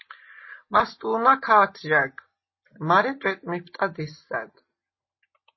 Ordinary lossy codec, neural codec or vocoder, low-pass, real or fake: MP3, 24 kbps; none; 7.2 kHz; real